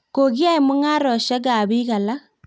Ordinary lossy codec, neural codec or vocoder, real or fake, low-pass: none; none; real; none